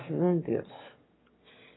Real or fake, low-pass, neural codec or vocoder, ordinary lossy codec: fake; 7.2 kHz; autoencoder, 22.05 kHz, a latent of 192 numbers a frame, VITS, trained on one speaker; AAC, 16 kbps